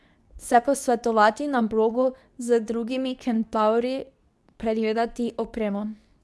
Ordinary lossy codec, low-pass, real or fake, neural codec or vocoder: none; none; fake; codec, 24 kHz, 0.9 kbps, WavTokenizer, medium speech release version 2